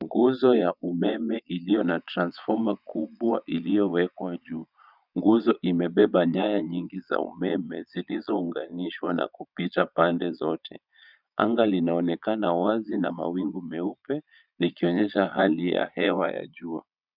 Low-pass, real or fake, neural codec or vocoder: 5.4 kHz; fake; vocoder, 22.05 kHz, 80 mel bands, Vocos